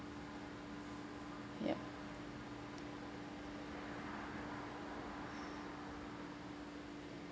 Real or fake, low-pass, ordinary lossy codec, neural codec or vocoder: real; none; none; none